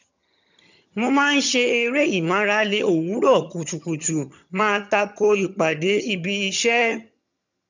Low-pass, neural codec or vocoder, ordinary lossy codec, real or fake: 7.2 kHz; vocoder, 22.05 kHz, 80 mel bands, HiFi-GAN; none; fake